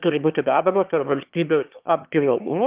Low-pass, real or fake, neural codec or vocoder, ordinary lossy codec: 3.6 kHz; fake; autoencoder, 22.05 kHz, a latent of 192 numbers a frame, VITS, trained on one speaker; Opus, 24 kbps